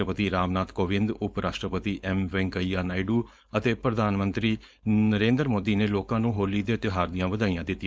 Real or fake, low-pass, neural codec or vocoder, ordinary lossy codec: fake; none; codec, 16 kHz, 4.8 kbps, FACodec; none